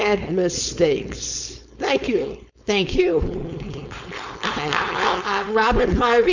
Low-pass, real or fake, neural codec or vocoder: 7.2 kHz; fake; codec, 16 kHz, 4.8 kbps, FACodec